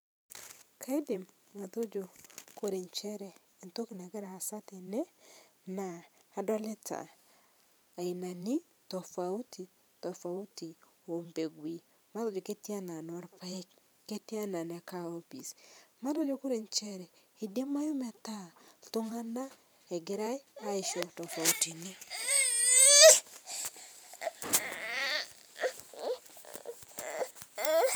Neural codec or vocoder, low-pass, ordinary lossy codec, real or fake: vocoder, 44.1 kHz, 128 mel bands every 512 samples, BigVGAN v2; none; none; fake